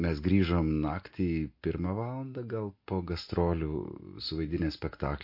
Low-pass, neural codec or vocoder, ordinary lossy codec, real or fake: 5.4 kHz; none; MP3, 32 kbps; real